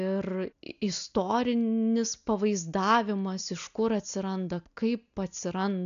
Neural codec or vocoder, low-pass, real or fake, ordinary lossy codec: none; 7.2 kHz; real; AAC, 96 kbps